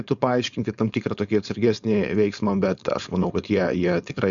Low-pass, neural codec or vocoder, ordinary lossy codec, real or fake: 7.2 kHz; codec, 16 kHz, 4.8 kbps, FACodec; Opus, 64 kbps; fake